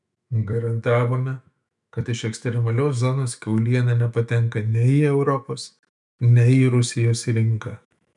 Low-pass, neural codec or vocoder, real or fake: 10.8 kHz; autoencoder, 48 kHz, 128 numbers a frame, DAC-VAE, trained on Japanese speech; fake